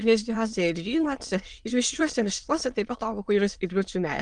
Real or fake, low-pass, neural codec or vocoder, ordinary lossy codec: fake; 9.9 kHz; autoencoder, 22.05 kHz, a latent of 192 numbers a frame, VITS, trained on many speakers; Opus, 24 kbps